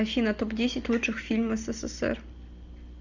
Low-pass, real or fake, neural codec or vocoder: 7.2 kHz; real; none